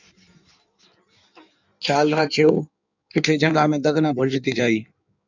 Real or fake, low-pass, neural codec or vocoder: fake; 7.2 kHz; codec, 16 kHz in and 24 kHz out, 1.1 kbps, FireRedTTS-2 codec